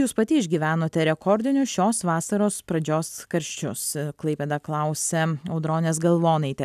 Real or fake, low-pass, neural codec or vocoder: real; 14.4 kHz; none